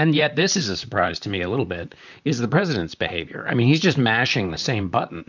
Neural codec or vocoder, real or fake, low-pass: vocoder, 44.1 kHz, 128 mel bands, Pupu-Vocoder; fake; 7.2 kHz